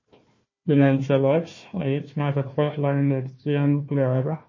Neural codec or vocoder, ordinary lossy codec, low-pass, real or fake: codec, 16 kHz, 1 kbps, FunCodec, trained on Chinese and English, 50 frames a second; MP3, 32 kbps; 7.2 kHz; fake